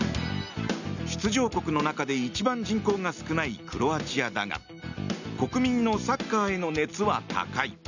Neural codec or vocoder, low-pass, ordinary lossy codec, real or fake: none; 7.2 kHz; none; real